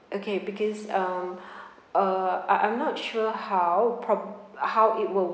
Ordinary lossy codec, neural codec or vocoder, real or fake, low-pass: none; none; real; none